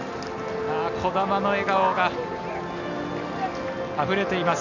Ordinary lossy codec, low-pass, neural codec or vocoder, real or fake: none; 7.2 kHz; none; real